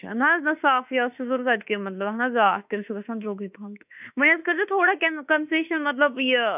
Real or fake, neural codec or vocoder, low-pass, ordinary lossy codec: fake; autoencoder, 48 kHz, 32 numbers a frame, DAC-VAE, trained on Japanese speech; 3.6 kHz; none